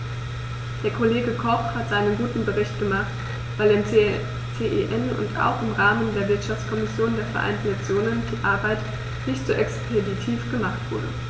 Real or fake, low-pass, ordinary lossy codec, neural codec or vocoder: real; none; none; none